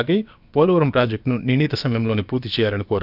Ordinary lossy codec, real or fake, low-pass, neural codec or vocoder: none; fake; 5.4 kHz; codec, 16 kHz, about 1 kbps, DyCAST, with the encoder's durations